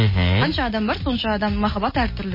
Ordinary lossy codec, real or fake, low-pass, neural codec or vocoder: MP3, 24 kbps; real; 5.4 kHz; none